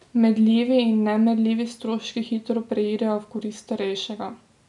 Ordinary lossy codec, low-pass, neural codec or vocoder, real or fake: none; 10.8 kHz; none; real